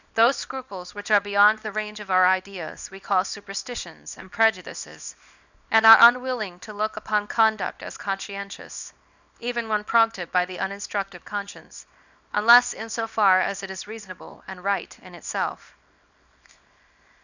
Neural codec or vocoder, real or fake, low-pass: codec, 24 kHz, 0.9 kbps, WavTokenizer, small release; fake; 7.2 kHz